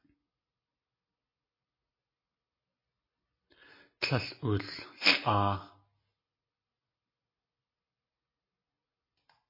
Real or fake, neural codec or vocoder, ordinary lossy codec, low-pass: real; none; MP3, 24 kbps; 5.4 kHz